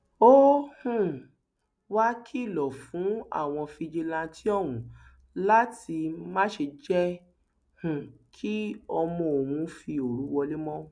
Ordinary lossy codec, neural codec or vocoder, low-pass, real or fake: none; none; 9.9 kHz; real